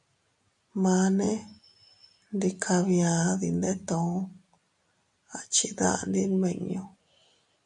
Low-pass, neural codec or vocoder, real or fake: 10.8 kHz; none; real